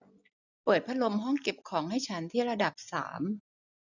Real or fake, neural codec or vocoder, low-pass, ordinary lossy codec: real; none; 7.2 kHz; none